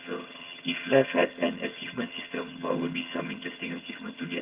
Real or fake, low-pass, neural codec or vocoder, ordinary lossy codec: fake; 3.6 kHz; vocoder, 22.05 kHz, 80 mel bands, HiFi-GAN; Opus, 32 kbps